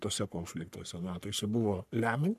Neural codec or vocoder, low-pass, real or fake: codec, 44.1 kHz, 3.4 kbps, Pupu-Codec; 14.4 kHz; fake